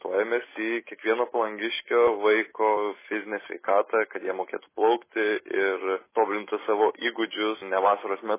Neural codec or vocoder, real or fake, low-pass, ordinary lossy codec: none; real; 3.6 kHz; MP3, 16 kbps